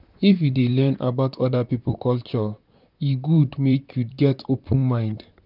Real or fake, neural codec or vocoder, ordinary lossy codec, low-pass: fake; vocoder, 44.1 kHz, 128 mel bands, Pupu-Vocoder; none; 5.4 kHz